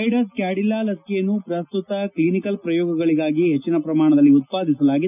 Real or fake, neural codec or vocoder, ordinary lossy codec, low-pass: real; none; none; 3.6 kHz